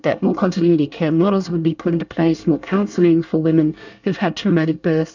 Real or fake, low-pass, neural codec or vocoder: fake; 7.2 kHz; codec, 24 kHz, 1 kbps, SNAC